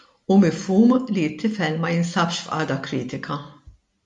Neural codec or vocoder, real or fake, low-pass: none; real; 10.8 kHz